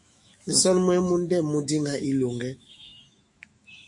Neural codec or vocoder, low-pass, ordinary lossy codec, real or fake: autoencoder, 48 kHz, 128 numbers a frame, DAC-VAE, trained on Japanese speech; 10.8 kHz; MP3, 48 kbps; fake